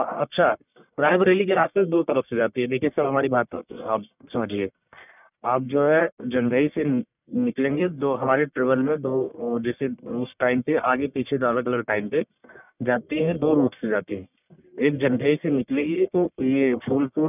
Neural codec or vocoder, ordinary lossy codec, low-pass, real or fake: codec, 44.1 kHz, 1.7 kbps, Pupu-Codec; none; 3.6 kHz; fake